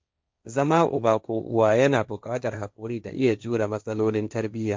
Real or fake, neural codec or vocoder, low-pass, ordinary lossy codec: fake; codec, 16 kHz, 1.1 kbps, Voila-Tokenizer; none; none